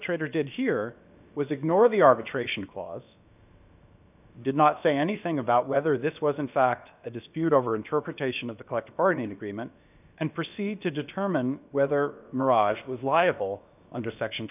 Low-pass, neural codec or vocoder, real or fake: 3.6 kHz; codec, 16 kHz, about 1 kbps, DyCAST, with the encoder's durations; fake